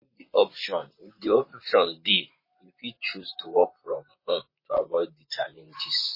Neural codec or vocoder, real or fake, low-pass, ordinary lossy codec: vocoder, 44.1 kHz, 128 mel bands, Pupu-Vocoder; fake; 5.4 kHz; MP3, 24 kbps